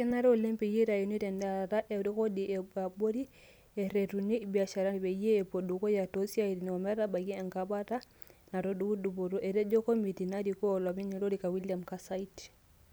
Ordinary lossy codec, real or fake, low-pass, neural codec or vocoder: none; real; none; none